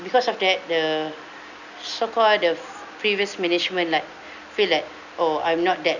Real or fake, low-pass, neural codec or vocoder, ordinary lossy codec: real; 7.2 kHz; none; none